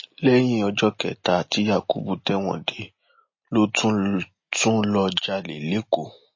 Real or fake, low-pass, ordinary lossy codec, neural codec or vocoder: real; 7.2 kHz; MP3, 32 kbps; none